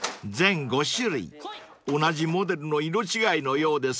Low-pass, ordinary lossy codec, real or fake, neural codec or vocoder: none; none; real; none